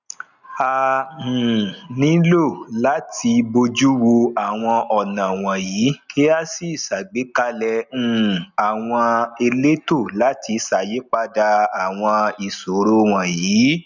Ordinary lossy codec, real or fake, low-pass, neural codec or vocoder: none; real; 7.2 kHz; none